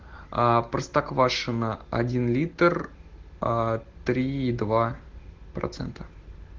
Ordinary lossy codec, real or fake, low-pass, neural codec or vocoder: Opus, 16 kbps; real; 7.2 kHz; none